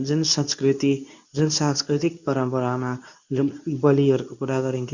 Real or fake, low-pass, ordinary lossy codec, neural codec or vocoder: fake; 7.2 kHz; none; codec, 24 kHz, 0.9 kbps, WavTokenizer, medium speech release version 2